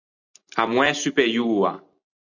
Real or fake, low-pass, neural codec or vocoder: real; 7.2 kHz; none